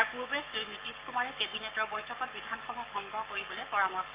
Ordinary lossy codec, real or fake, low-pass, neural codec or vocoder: Opus, 32 kbps; fake; 3.6 kHz; codec, 44.1 kHz, 7.8 kbps, Pupu-Codec